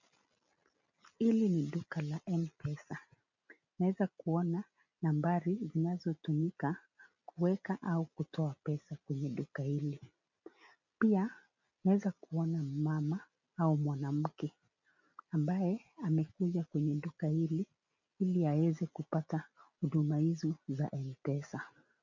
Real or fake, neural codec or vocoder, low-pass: real; none; 7.2 kHz